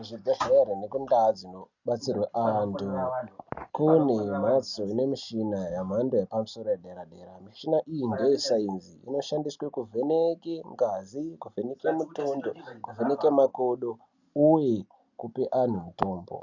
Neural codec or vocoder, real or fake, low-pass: none; real; 7.2 kHz